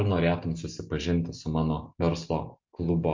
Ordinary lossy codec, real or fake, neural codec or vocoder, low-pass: MP3, 48 kbps; real; none; 7.2 kHz